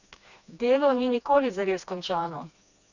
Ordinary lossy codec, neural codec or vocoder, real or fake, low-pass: none; codec, 16 kHz, 2 kbps, FreqCodec, smaller model; fake; 7.2 kHz